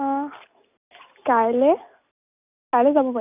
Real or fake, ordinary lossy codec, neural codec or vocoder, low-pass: real; none; none; 3.6 kHz